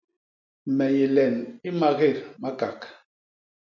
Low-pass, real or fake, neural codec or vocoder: 7.2 kHz; real; none